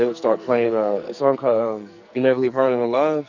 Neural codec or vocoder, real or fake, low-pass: codec, 44.1 kHz, 2.6 kbps, SNAC; fake; 7.2 kHz